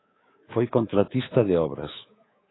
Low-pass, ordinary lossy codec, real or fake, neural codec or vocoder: 7.2 kHz; AAC, 16 kbps; fake; codec, 24 kHz, 3.1 kbps, DualCodec